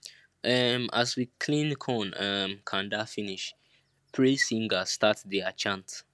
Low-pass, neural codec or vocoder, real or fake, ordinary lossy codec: none; none; real; none